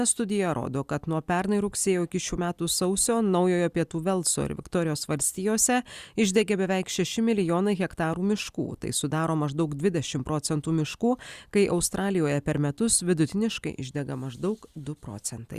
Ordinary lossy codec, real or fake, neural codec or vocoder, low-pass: Opus, 64 kbps; real; none; 14.4 kHz